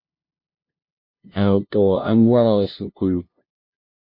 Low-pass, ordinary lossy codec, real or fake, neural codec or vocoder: 5.4 kHz; MP3, 32 kbps; fake; codec, 16 kHz, 0.5 kbps, FunCodec, trained on LibriTTS, 25 frames a second